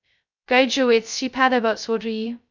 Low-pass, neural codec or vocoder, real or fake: 7.2 kHz; codec, 16 kHz, 0.2 kbps, FocalCodec; fake